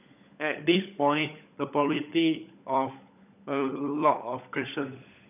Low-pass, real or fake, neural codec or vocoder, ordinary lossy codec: 3.6 kHz; fake; codec, 16 kHz, 16 kbps, FunCodec, trained on LibriTTS, 50 frames a second; none